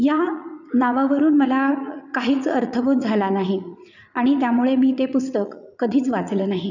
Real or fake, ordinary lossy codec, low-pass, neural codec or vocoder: fake; none; 7.2 kHz; vocoder, 44.1 kHz, 80 mel bands, Vocos